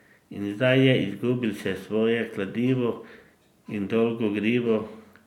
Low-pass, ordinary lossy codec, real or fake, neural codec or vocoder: 19.8 kHz; none; real; none